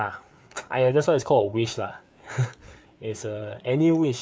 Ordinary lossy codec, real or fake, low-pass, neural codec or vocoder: none; fake; none; codec, 16 kHz, 4 kbps, FreqCodec, larger model